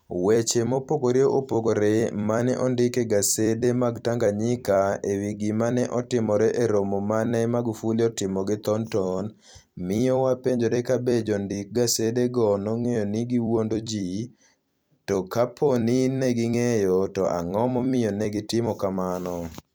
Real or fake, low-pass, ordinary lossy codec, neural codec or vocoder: fake; none; none; vocoder, 44.1 kHz, 128 mel bands every 256 samples, BigVGAN v2